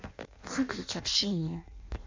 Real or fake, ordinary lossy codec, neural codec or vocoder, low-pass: fake; MP3, 64 kbps; codec, 16 kHz in and 24 kHz out, 0.6 kbps, FireRedTTS-2 codec; 7.2 kHz